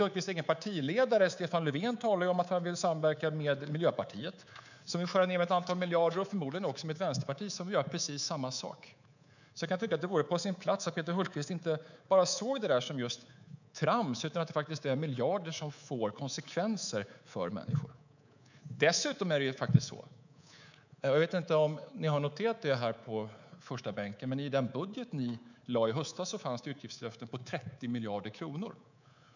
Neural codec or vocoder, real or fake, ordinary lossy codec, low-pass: codec, 24 kHz, 3.1 kbps, DualCodec; fake; none; 7.2 kHz